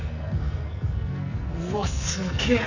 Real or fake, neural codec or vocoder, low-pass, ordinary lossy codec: fake; codec, 44.1 kHz, 2.6 kbps, SNAC; 7.2 kHz; none